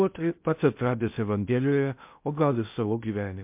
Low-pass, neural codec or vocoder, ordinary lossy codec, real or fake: 3.6 kHz; codec, 16 kHz in and 24 kHz out, 0.6 kbps, FocalCodec, streaming, 4096 codes; MP3, 32 kbps; fake